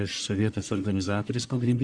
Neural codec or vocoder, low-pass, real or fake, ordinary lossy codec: codec, 44.1 kHz, 1.7 kbps, Pupu-Codec; 9.9 kHz; fake; Opus, 64 kbps